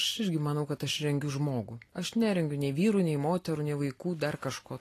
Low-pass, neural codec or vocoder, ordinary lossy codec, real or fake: 14.4 kHz; none; AAC, 48 kbps; real